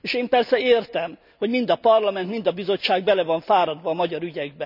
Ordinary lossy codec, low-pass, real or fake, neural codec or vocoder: none; 5.4 kHz; real; none